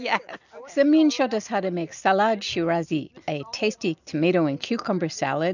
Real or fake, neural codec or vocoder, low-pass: real; none; 7.2 kHz